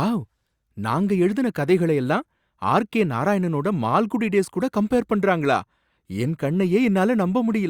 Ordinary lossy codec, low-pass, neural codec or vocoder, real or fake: Opus, 64 kbps; 19.8 kHz; none; real